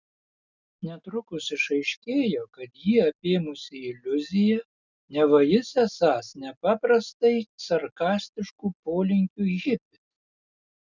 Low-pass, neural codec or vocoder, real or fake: 7.2 kHz; none; real